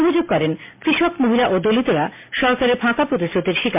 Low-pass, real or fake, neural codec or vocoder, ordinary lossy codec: 3.6 kHz; real; none; MP3, 24 kbps